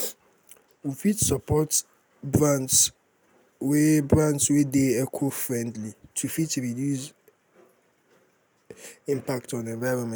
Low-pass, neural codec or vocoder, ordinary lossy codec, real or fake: none; none; none; real